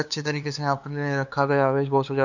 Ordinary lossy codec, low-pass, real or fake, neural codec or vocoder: none; 7.2 kHz; fake; codec, 16 kHz, 2 kbps, FunCodec, trained on LibriTTS, 25 frames a second